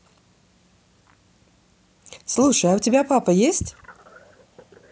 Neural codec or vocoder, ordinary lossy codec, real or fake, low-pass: none; none; real; none